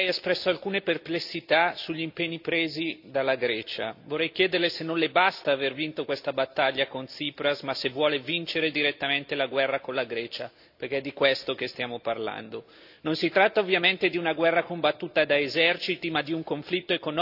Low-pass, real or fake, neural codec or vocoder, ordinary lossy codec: 5.4 kHz; real; none; AAC, 48 kbps